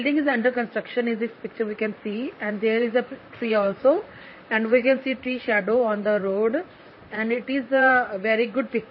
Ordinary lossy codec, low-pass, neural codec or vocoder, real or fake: MP3, 24 kbps; 7.2 kHz; vocoder, 44.1 kHz, 128 mel bands, Pupu-Vocoder; fake